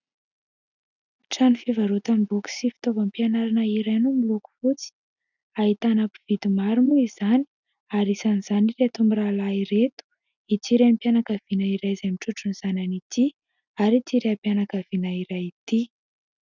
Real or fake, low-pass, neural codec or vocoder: real; 7.2 kHz; none